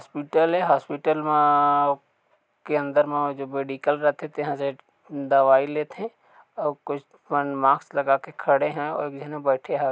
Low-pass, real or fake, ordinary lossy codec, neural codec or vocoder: none; real; none; none